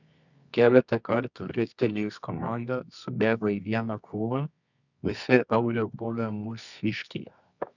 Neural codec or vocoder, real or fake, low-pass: codec, 24 kHz, 0.9 kbps, WavTokenizer, medium music audio release; fake; 7.2 kHz